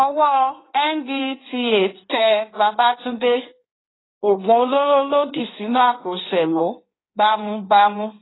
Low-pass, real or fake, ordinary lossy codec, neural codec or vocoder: 7.2 kHz; fake; AAC, 16 kbps; codec, 16 kHz in and 24 kHz out, 1.1 kbps, FireRedTTS-2 codec